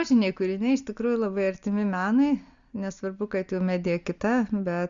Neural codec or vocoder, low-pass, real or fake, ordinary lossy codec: none; 7.2 kHz; real; Opus, 64 kbps